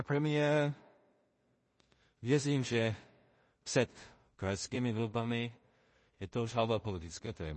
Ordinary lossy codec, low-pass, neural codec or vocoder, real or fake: MP3, 32 kbps; 10.8 kHz; codec, 16 kHz in and 24 kHz out, 0.4 kbps, LongCat-Audio-Codec, two codebook decoder; fake